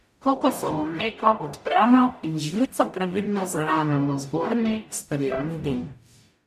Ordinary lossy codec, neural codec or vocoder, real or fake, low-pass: none; codec, 44.1 kHz, 0.9 kbps, DAC; fake; 14.4 kHz